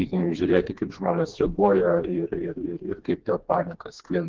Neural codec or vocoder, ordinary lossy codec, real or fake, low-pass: codec, 16 kHz, 2 kbps, FreqCodec, smaller model; Opus, 16 kbps; fake; 7.2 kHz